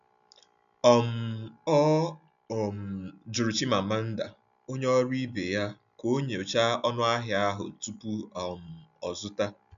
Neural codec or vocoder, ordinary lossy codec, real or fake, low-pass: none; none; real; 7.2 kHz